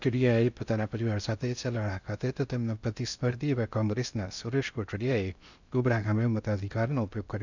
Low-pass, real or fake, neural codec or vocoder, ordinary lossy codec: 7.2 kHz; fake; codec, 16 kHz in and 24 kHz out, 0.6 kbps, FocalCodec, streaming, 2048 codes; none